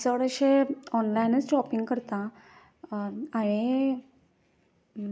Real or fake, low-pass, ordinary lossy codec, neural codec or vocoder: real; none; none; none